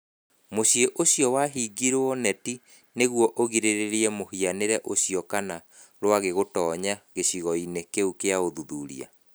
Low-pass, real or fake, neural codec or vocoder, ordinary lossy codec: none; real; none; none